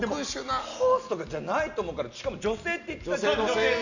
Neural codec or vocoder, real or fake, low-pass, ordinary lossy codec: none; real; 7.2 kHz; none